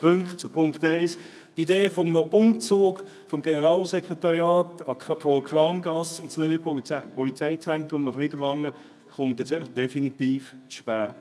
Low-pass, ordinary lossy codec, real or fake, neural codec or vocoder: none; none; fake; codec, 24 kHz, 0.9 kbps, WavTokenizer, medium music audio release